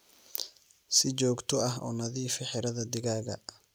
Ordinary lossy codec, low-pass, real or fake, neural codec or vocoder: none; none; real; none